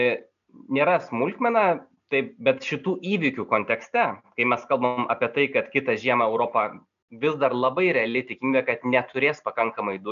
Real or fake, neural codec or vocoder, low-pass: real; none; 7.2 kHz